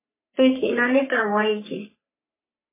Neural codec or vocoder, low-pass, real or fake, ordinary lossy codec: codec, 44.1 kHz, 3.4 kbps, Pupu-Codec; 3.6 kHz; fake; MP3, 16 kbps